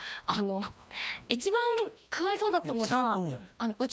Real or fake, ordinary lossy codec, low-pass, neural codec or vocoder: fake; none; none; codec, 16 kHz, 1 kbps, FreqCodec, larger model